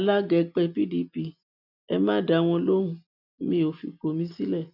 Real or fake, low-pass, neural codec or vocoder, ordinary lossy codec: real; 5.4 kHz; none; none